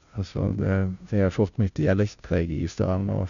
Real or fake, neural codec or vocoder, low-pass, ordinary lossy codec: fake; codec, 16 kHz, 0.5 kbps, FunCodec, trained on Chinese and English, 25 frames a second; 7.2 kHz; none